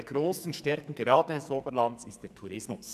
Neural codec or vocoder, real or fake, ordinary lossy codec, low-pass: codec, 44.1 kHz, 2.6 kbps, SNAC; fake; none; 14.4 kHz